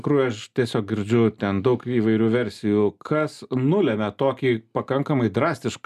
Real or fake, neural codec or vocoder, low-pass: real; none; 14.4 kHz